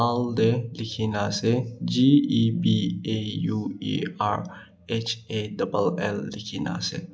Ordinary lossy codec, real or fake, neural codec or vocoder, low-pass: none; real; none; none